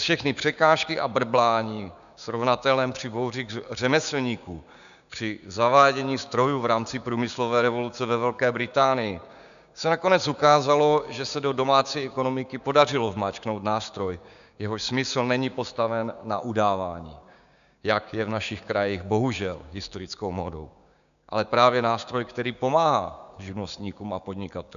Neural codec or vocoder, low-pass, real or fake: codec, 16 kHz, 6 kbps, DAC; 7.2 kHz; fake